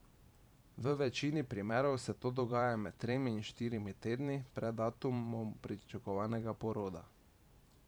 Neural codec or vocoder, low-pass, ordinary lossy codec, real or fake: vocoder, 44.1 kHz, 128 mel bands every 512 samples, BigVGAN v2; none; none; fake